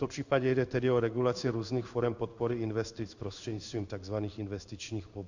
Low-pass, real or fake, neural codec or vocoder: 7.2 kHz; fake; codec, 16 kHz in and 24 kHz out, 1 kbps, XY-Tokenizer